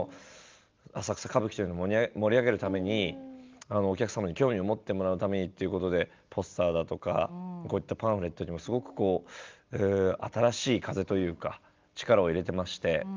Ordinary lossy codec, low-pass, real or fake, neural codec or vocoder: Opus, 32 kbps; 7.2 kHz; real; none